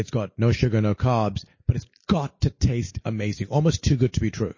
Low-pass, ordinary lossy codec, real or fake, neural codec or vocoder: 7.2 kHz; MP3, 32 kbps; real; none